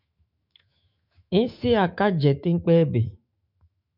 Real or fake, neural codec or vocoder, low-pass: fake; autoencoder, 48 kHz, 128 numbers a frame, DAC-VAE, trained on Japanese speech; 5.4 kHz